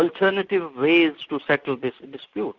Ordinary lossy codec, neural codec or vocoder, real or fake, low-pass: Opus, 64 kbps; none; real; 7.2 kHz